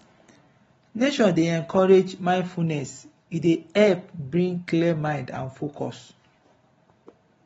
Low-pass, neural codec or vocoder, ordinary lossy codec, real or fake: 14.4 kHz; none; AAC, 24 kbps; real